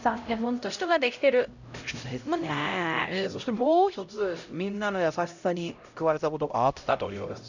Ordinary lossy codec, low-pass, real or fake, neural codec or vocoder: none; 7.2 kHz; fake; codec, 16 kHz, 0.5 kbps, X-Codec, HuBERT features, trained on LibriSpeech